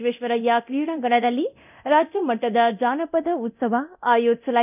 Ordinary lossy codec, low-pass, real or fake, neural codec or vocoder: none; 3.6 kHz; fake; codec, 24 kHz, 0.9 kbps, DualCodec